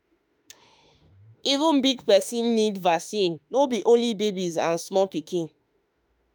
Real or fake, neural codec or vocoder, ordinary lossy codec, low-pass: fake; autoencoder, 48 kHz, 32 numbers a frame, DAC-VAE, trained on Japanese speech; none; none